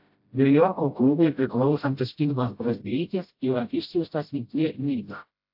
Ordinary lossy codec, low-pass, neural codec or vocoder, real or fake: AAC, 48 kbps; 5.4 kHz; codec, 16 kHz, 0.5 kbps, FreqCodec, smaller model; fake